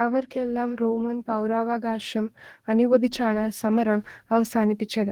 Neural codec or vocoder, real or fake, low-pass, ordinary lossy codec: codec, 44.1 kHz, 2.6 kbps, DAC; fake; 19.8 kHz; Opus, 24 kbps